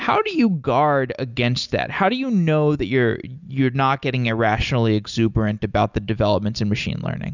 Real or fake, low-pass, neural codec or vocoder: real; 7.2 kHz; none